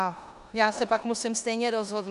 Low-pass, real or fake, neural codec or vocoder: 10.8 kHz; fake; codec, 16 kHz in and 24 kHz out, 0.9 kbps, LongCat-Audio-Codec, four codebook decoder